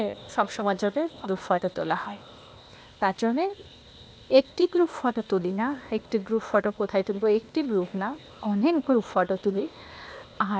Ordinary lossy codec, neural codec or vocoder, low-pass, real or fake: none; codec, 16 kHz, 0.8 kbps, ZipCodec; none; fake